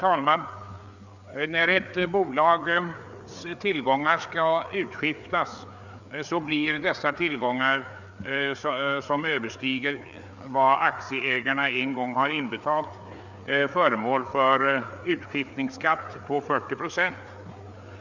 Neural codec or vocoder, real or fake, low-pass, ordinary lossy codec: codec, 16 kHz, 4 kbps, FreqCodec, larger model; fake; 7.2 kHz; none